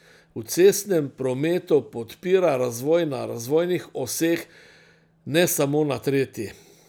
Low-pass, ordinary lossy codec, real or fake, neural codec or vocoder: none; none; real; none